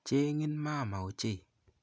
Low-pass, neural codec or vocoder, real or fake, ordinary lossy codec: none; none; real; none